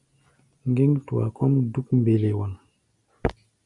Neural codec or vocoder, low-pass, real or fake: none; 10.8 kHz; real